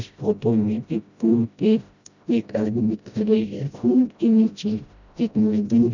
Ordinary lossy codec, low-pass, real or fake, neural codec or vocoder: none; 7.2 kHz; fake; codec, 16 kHz, 0.5 kbps, FreqCodec, smaller model